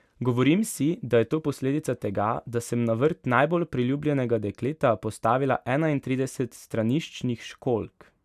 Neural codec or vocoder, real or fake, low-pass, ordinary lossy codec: none; real; 14.4 kHz; none